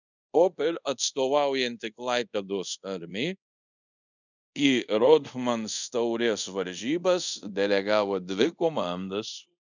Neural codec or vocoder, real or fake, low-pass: codec, 24 kHz, 0.5 kbps, DualCodec; fake; 7.2 kHz